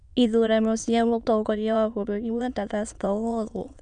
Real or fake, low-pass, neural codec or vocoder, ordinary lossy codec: fake; 9.9 kHz; autoencoder, 22.05 kHz, a latent of 192 numbers a frame, VITS, trained on many speakers; none